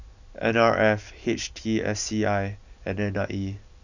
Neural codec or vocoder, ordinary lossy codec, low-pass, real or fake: none; none; 7.2 kHz; real